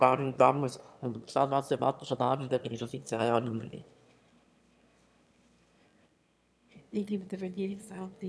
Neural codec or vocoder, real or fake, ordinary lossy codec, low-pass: autoencoder, 22.05 kHz, a latent of 192 numbers a frame, VITS, trained on one speaker; fake; none; none